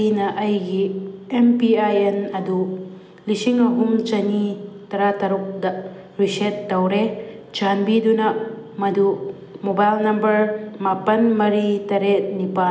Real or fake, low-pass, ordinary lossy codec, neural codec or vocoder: real; none; none; none